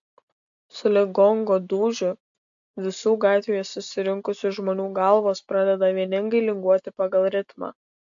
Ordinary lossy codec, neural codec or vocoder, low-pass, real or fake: AAC, 64 kbps; none; 7.2 kHz; real